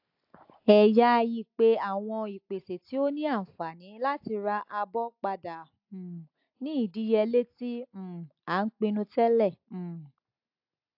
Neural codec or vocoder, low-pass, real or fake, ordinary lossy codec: none; 5.4 kHz; real; AAC, 48 kbps